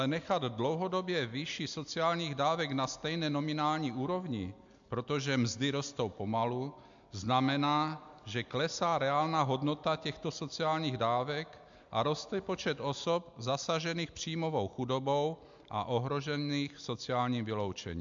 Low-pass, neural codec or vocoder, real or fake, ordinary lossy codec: 7.2 kHz; none; real; MP3, 64 kbps